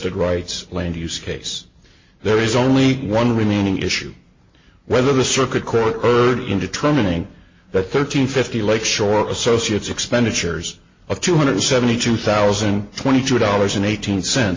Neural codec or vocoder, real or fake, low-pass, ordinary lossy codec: none; real; 7.2 kHz; MP3, 32 kbps